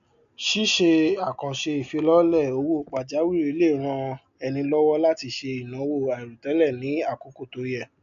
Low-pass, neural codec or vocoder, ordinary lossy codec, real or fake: 7.2 kHz; none; none; real